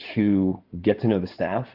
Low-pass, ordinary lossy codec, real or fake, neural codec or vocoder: 5.4 kHz; Opus, 32 kbps; fake; codec, 16 kHz in and 24 kHz out, 2.2 kbps, FireRedTTS-2 codec